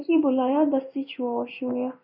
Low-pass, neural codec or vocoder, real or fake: 5.4 kHz; codec, 16 kHz in and 24 kHz out, 1 kbps, XY-Tokenizer; fake